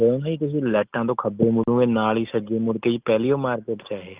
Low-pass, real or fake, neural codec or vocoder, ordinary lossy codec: 3.6 kHz; real; none; Opus, 24 kbps